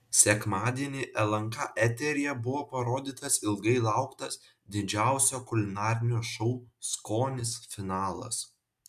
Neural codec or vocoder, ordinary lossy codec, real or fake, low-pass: none; MP3, 96 kbps; real; 14.4 kHz